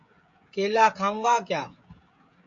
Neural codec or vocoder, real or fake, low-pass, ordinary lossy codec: codec, 16 kHz, 16 kbps, FreqCodec, smaller model; fake; 7.2 kHz; AAC, 64 kbps